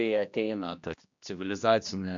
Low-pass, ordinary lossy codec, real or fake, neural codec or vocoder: 7.2 kHz; MP3, 64 kbps; fake; codec, 16 kHz, 1 kbps, X-Codec, HuBERT features, trained on general audio